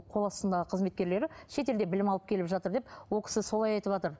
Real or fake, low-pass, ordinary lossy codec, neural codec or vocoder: real; none; none; none